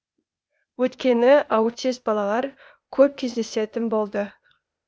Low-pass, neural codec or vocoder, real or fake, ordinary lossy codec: none; codec, 16 kHz, 0.8 kbps, ZipCodec; fake; none